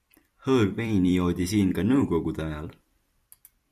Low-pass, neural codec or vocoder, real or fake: 14.4 kHz; vocoder, 44.1 kHz, 128 mel bands every 256 samples, BigVGAN v2; fake